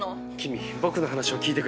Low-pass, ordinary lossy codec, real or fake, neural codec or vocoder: none; none; real; none